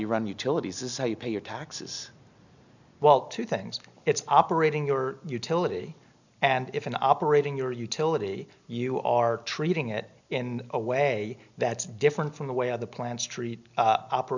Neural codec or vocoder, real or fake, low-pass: none; real; 7.2 kHz